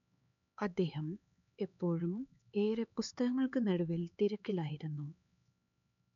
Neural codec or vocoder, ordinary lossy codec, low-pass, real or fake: codec, 16 kHz, 4 kbps, X-Codec, HuBERT features, trained on LibriSpeech; none; 7.2 kHz; fake